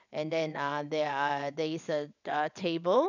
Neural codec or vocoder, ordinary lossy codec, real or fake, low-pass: vocoder, 22.05 kHz, 80 mel bands, WaveNeXt; none; fake; 7.2 kHz